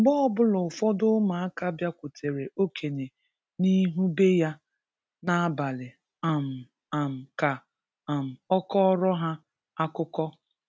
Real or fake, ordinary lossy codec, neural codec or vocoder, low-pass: real; none; none; none